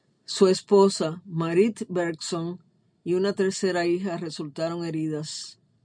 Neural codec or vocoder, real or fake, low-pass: none; real; 9.9 kHz